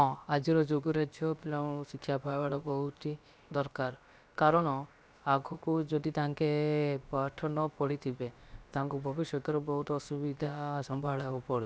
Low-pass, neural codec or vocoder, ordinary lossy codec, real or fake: none; codec, 16 kHz, about 1 kbps, DyCAST, with the encoder's durations; none; fake